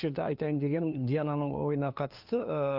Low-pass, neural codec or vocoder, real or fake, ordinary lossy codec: 5.4 kHz; codec, 16 kHz, 2 kbps, FunCodec, trained on LibriTTS, 25 frames a second; fake; Opus, 32 kbps